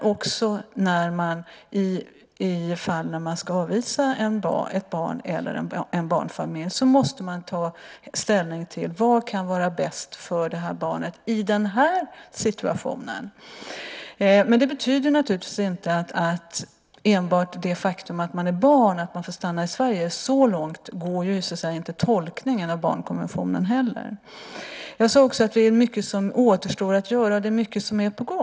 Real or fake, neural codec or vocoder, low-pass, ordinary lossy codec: real; none; none; none